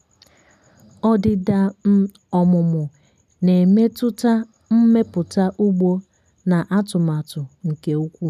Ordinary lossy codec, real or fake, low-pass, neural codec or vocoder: none; real; 14.4 kHz; none